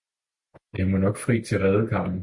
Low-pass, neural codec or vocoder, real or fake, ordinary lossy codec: 10.8 kHz; none; real; Opus, 64 kbps